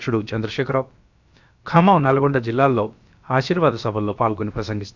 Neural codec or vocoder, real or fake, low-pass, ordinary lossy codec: codec, 16 kHz, about 1 kbps, DyCAST, with the encoder's durations; fake; 7.2 kHz; none